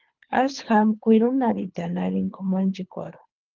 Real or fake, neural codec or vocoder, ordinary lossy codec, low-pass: fake; codec, 24 kHz, 3 kbps, HILCodec; Opus, 24 kbps; 7.2 kHz